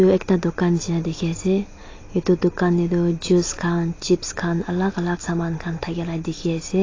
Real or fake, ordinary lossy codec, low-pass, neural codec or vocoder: real; AAC, 32 kbps; 7.2 kHz; none